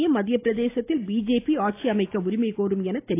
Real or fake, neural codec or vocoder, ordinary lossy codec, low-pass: real; none; AAC, 24 kbps; 3.6 kHz